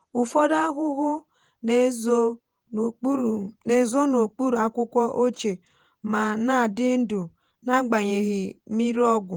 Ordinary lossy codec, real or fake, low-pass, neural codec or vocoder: Opus, 24 kbps; fake; 19.8 kHz; vocoder, 48 kHz, 128 mel bands, Vocos